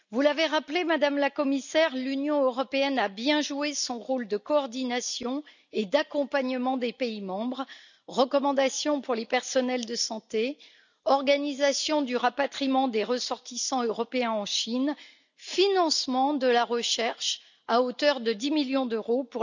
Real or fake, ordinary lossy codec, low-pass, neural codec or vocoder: real; none; 7.2 kHz; none